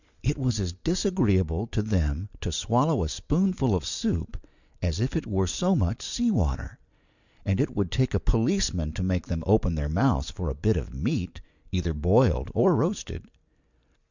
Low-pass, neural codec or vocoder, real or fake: 7.2 kHz; none; real